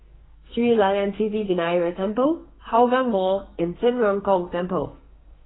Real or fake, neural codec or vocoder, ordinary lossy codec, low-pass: fake; codec, 44.1 kHz, 2.6 kbps, SNAC; AAC, 16 kbps; 7.2 kHz